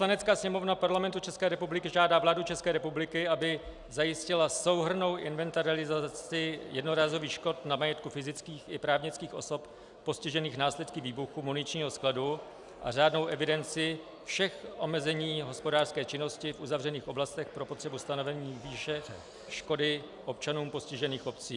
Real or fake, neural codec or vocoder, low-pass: real; none; 10.8 kHz